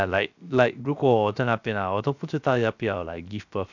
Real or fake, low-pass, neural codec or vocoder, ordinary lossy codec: fake; 7.2 kHz; codec, 16 kHz, 0.7 kbps, FocalCodec; none